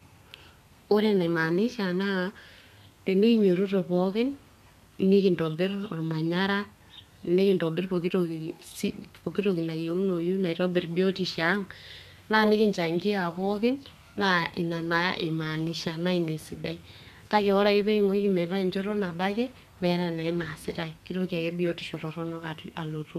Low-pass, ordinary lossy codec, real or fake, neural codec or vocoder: 14.4 kHz; MP3, 96 kbps; fake; codec, 32 kHz, 1.9 kbps, SNAC